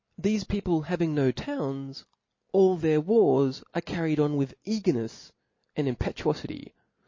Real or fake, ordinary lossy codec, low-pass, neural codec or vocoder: real; MP3, 32 kbps; 7.2 kHz; none